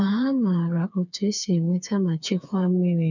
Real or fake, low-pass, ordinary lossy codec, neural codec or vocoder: fake; 7.2 kHz; none; codec, 16 kHz, 4 kbps, FreqCodec, smaller model